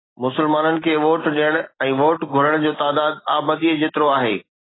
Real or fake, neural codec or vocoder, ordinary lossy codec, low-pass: real; none; AAC, 16 kbps; 7.2 kHz